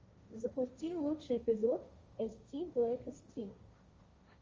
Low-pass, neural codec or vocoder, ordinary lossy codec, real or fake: 7.2 kHz; codec, 16 kHz, 1.1 kbps, Voila-Tokenizer; Opus, 32 kbps; fake